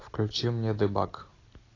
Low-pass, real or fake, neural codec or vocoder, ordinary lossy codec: 7.2 kHz; real; none; AAC, 32 kbps